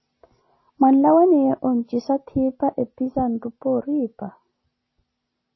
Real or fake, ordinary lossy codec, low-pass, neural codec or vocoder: real; MP3, 24 kbps; 7.2 kHz; none